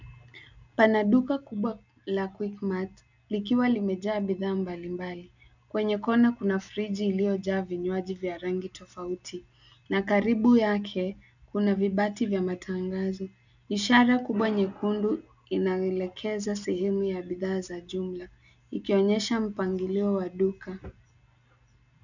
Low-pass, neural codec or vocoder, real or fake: 7.2 kHz; none; real